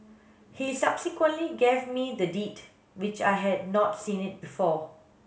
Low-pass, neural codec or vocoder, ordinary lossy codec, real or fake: none; none; none; real